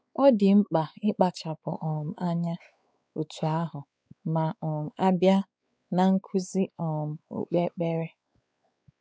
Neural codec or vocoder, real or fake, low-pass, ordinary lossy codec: codec, 16 kHz, 4 kbps, X-Codec, WavLM features, trained on Multilingual LibriSpeech; fake; none; none